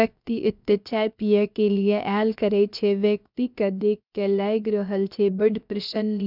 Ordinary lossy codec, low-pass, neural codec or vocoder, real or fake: none; 5.4 kHz; codec, 16 kHz, about 1 kbps, DyCAST, with the encoder's durations; fake